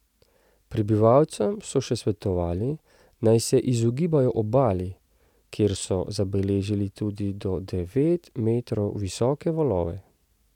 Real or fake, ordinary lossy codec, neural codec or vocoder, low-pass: real; none; none; 19.8 kHz